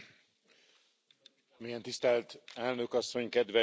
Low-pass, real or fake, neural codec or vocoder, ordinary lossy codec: none; real; none; none